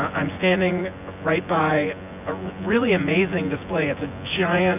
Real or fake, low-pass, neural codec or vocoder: fake; 3.6 kHz; vocoder, 24 kHz, 100 mel bands, Vocos